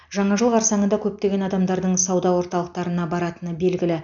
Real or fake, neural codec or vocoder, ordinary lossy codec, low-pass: real; none; none; 7.2 kHz